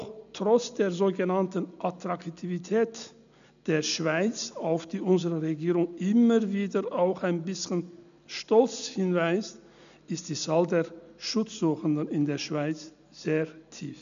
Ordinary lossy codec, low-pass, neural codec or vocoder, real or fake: none; 7.2 kHz; none; real